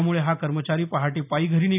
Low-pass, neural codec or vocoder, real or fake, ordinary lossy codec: 3.6 kHz; none; real; none